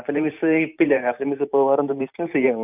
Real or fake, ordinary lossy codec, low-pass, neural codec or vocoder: fake; none; 3.6 kHz; vocoder, 44.1 kHz, 128 mel bands every 512 samples, BigVGAN v2